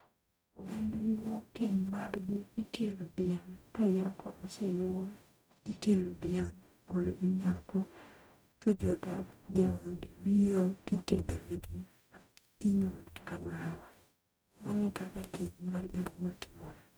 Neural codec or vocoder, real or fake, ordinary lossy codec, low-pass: codec, 44.1 kHz, 0.9 kbps, DAC; fake; none; none